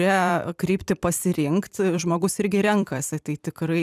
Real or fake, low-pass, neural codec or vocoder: fake; 14.4 kHz; vocoder, 44.1 kHz, 128 mel bands every 256 samples, BigVGAN v2